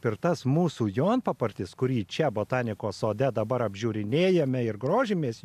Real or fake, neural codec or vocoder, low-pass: real; none; 14.4 kHz